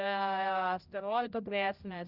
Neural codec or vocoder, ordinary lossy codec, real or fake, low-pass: codec, 16 kHz, 0.5 kbps, X-Codec, HuBERT features, trained on general audio; Opus, 24 kbps; fake; 5.4 kHz